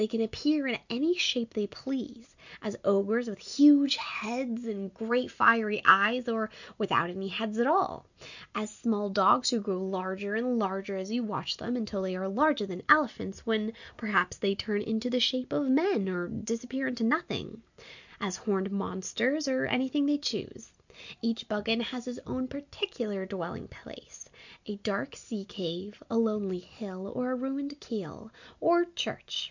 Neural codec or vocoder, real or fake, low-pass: none; real; 7.2 kHz